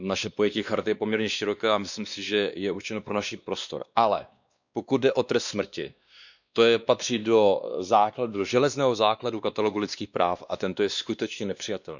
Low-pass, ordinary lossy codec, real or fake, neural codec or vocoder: 7.2 kHz; none; fake; codec, 16 kHz, 2 kbps, X-Codec, WavLM features, trained on Multilingual LibriSpeech